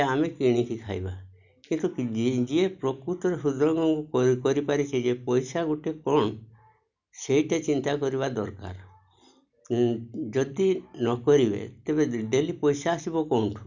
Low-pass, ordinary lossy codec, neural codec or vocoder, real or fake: 7.2 kHz; none; none; real